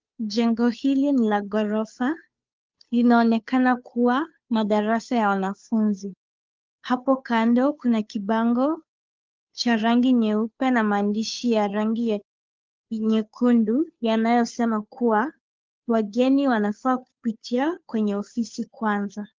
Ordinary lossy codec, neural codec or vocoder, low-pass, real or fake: Opus, 32 kbps; codec, 16 kHz, 2 kbps, FunCodec, trained on Chinese and English, 25 frames a second; 7.2 kHz; fake